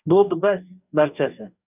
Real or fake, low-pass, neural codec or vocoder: fake; 3.6 kHz; codec, 44.1 kHz, 2.6 kbps, DAC